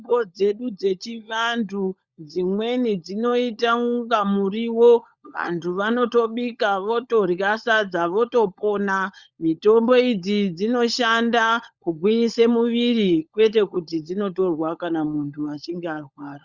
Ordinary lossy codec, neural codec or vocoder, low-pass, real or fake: Opus, 64 kbps; codec, 16 kHz, 16 kbps, FunCodec, trained on LibriTTS, 50 frames a second; 7.2 kHz; fake